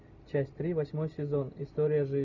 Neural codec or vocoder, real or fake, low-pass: none; real; 7.2 kHz